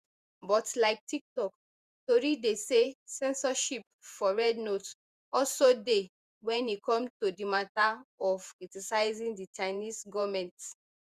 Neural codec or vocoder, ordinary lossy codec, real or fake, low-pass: vocoder, 48 kHz, 128 mel bands, Vocos; Opus, 64 kbps; fake; 14.4 kHz